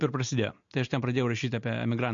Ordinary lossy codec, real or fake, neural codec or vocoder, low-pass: MP3, 48 kbps; real; none; 7.2 kHz